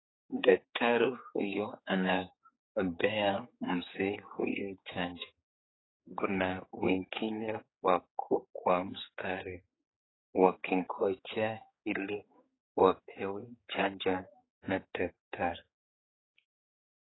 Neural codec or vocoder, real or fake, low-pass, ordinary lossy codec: codec, 16 kHz, 4 kbps, X-Codec, HuBERT features, trained on general audio; fake; 7.2 kHz; AAC, 16 kbps